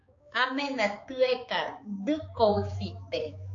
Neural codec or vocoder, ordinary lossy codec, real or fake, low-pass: codec, 16 kHz, 4 kbps, X-Codec, HuBERT features, trained on balanced general audio; AAC, 48 kbps; fake; 7.2 kHz